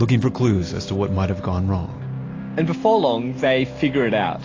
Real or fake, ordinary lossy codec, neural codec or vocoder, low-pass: real; AAC, 32 kbps; none; 7.2 kHz